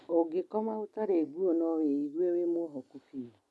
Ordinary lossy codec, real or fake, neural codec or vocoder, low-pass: none; real; none; none